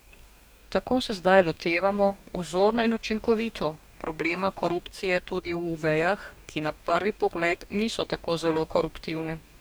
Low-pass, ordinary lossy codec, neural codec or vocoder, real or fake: none; none; codec, 44.1 kHz, 2.6 kbps, DAC; fake